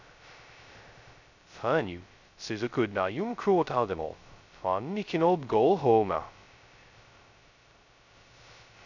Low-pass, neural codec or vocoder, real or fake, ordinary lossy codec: 7.2 kHz; codec, 16 kHz, 0.2 kbps, FocalCodec; fake; none